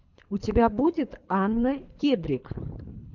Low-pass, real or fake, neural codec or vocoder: 7.2 kHz; fake; codec, 24 kHz, 3 kbps, HILCodec